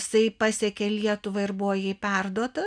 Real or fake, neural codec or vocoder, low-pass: real; none; 9.9 kHz